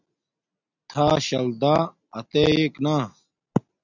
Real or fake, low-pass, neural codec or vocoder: real; 7.2 kHz; none